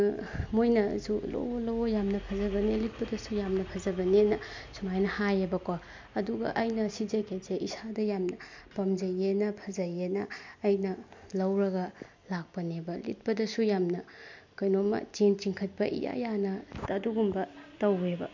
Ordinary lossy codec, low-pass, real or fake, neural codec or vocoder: MP3, 48 kbps; 7.2 kHz; real; none